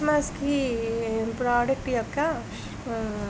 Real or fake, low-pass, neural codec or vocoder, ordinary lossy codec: real; none; none; none